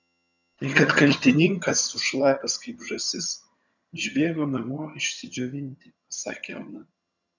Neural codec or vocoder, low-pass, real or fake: vocoder, 22.05 kHz, 80 mel bands, HiFi-GAN; 7.2 kHz; fake